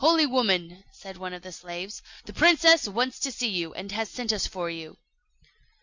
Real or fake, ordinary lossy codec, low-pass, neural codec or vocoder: real; Opus, 64 kbps; 7.2 kHz; none